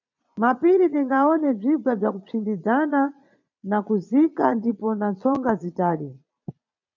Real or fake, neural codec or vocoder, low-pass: fake; vocoder, 22.05 kHz, 80 mel bands, Vocos; 7.2 kHz